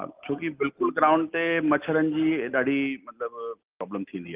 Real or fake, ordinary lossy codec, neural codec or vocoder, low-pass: real; Opus, 24 kbps; none; 3.6 kHz